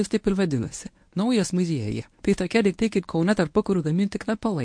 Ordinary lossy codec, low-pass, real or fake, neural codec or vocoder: MP3, 48 kbps; 9.9 kHz; fake; codec, 24 kHz, 0.9 kbps, WavTokenizer, medium speech release version 1